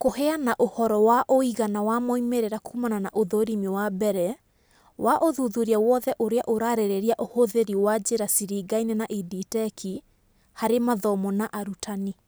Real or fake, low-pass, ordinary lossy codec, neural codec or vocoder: real; none; none; none